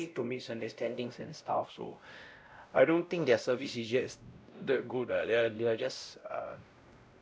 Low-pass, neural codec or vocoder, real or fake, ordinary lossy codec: none; codec, 16 kHz, 0.5 kbps, X-Codec, WavLM features, trained on Multilingual LibriSpeech; fake; none